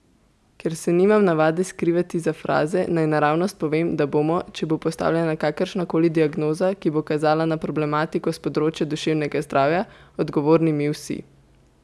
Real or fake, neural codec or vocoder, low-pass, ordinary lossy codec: real; none; none; none